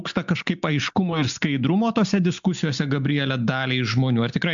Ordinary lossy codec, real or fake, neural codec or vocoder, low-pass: MP3, 64 kbps; real; none; 7.2 kHz